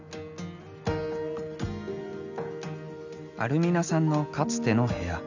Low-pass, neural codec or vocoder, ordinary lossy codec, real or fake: 7.2 kHz; none; none; real